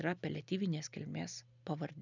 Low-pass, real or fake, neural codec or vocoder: 7.2 kHz; real; none